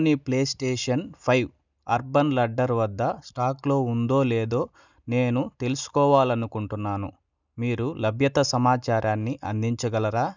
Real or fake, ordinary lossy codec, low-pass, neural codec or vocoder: real; none; 7.2 kHz; none